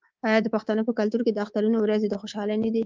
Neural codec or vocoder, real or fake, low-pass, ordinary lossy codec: codec, 24 kHz, 3.1 kbps, DualCodec; fake; 7.2 kHz; Opus, 32 kbps